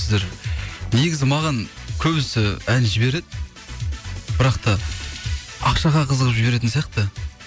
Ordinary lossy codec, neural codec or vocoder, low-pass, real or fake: none; none; none; real